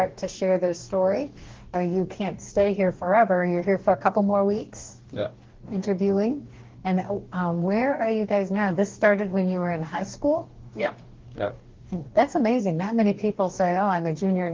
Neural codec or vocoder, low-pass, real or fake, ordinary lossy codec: codec, 44.1 kHz, 2.6 kbps, DAC; 7.2 kHz; fake; Opus, 16 kbps